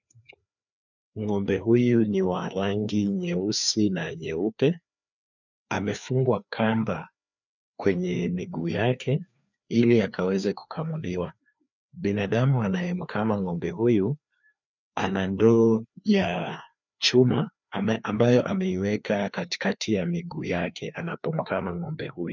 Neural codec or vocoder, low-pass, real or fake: codec, 16 kHz, 2 kbps, FreqCodec, larger model; 7.2 kHz; fake